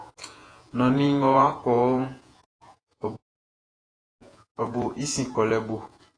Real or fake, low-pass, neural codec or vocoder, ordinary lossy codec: fake; 9.9 kHz; vocoder, 48 kHz, 128 mel bands, Vocos; AAC, 32 kbps